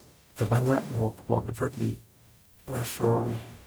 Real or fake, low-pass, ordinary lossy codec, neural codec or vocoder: fake; none; none; codec, 44.1 kHz, 0.9 kbps, DAC